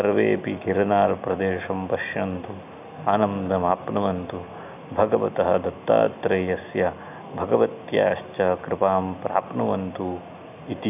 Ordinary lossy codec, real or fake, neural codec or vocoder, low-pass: none; real; none; 3.6 kHz